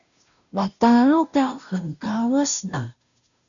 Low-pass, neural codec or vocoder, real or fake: 7.2 kHz; codec, 16 kHz, 0.5 kbps, FunCodec, trained on Chinese and English, 25 frames a second; fake